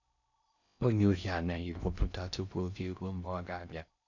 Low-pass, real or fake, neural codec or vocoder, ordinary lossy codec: 7.2 kHz; fake; codec, 16 kHz in and 24 kHz out, 0.6 kbps, FocalCodec, streaming, 4096 codes; none